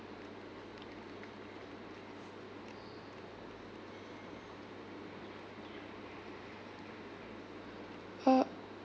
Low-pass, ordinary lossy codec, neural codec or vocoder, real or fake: none; none; none; real